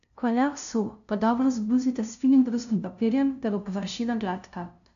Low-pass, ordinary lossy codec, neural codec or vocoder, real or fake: 7.2 kHz; none; codec, 16 kHz, 0.5 kbps, FunCodec, trained on LibriTTS, 25 frames a second; fake